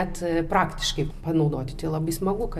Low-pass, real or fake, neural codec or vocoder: 14.4 kHz; real; none